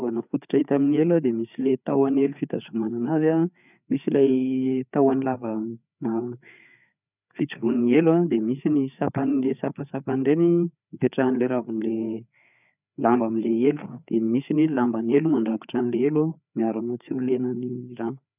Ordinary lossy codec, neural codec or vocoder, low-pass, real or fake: none; codec, 16 kHz, 4 kbps, FreqCodec, larger model; 3.6 kHz; fake